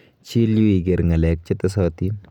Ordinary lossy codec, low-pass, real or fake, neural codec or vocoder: none; 19.8 kHz; real; none